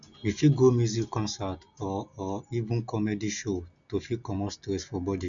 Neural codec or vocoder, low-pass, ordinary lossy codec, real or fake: none; 7.2 kHz; none; real